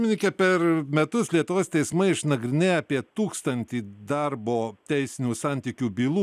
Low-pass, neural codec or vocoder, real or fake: 14.4 kHz; none; real